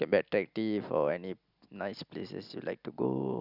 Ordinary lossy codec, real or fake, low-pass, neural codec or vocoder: Opus, 64 kbps; real; 5.4 kHz; none